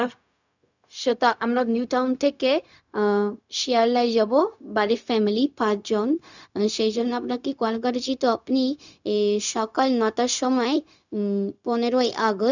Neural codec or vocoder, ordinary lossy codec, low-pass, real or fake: codec, 16 kHz, 0.4 kbps, LongCat-Audio-Codec; none; 7.2 kHz; fake